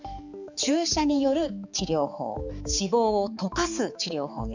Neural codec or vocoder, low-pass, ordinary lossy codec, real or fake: codec, 16 kHz, 4 kbps, X-Codec, HuBERT features, trained on balanced general audio; 7.2 kHz; none; fake